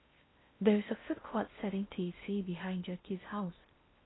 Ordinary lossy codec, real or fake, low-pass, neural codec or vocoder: AAC, 16 kbps; fake; 7.2 kHz; codec, 16 kHz in and 24 kHz out, 0.6 kbps, FocalCodec, streaming, 4096 codes